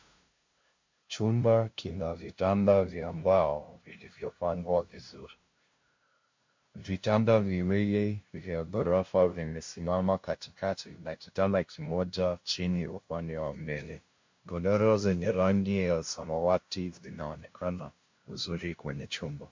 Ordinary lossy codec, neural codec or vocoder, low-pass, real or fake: MP3, 48 kbps; codec, 16 kHz, 0.5 kbps, FunCodec, trained on LibriTTS, 25 frames a second; 7.2 kHz; fake